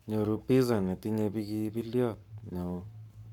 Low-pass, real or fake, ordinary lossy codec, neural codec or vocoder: 19.8 kHz; fake; none; codec, 44.1 kHz, 7.8 kbps, Pupu-Codec